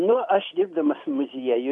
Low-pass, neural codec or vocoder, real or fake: 10.8 kHz; none; real